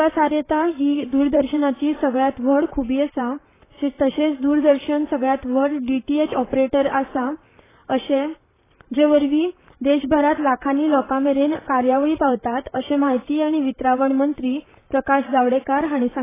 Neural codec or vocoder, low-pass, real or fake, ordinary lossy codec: vocoder, 44.1 kHz, 128 mel bands, Pupu-Vocoder; 3.6 kHz; fake; AAC, 16 kbps